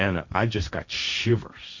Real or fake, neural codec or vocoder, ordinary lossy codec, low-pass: fake; codec, 16 kHz, 1.1 kbps, Voila-Tokenizer; Opus, 64 kbps; 7.2 kHz